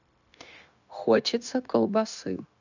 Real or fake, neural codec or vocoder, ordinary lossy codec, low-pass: fake; codec, 16 kHz, 0.9 kbps, LongCat-Audio-Codec; none; 7.2 kHz